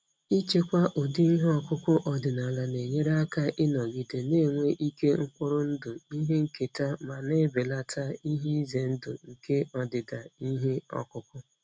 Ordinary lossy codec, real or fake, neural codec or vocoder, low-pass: none; real; none; none